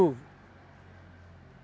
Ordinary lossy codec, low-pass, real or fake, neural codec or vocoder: none; none; real; none